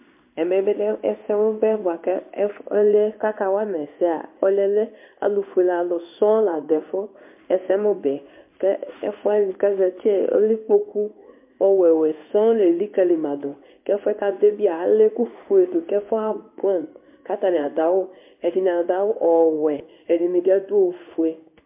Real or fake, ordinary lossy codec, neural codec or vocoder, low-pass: fake; MP3, 32 kbps; codec, 16 kHz in and 24 kHz out, 1 kbps, XY-Tokenizer; 3.6 kHz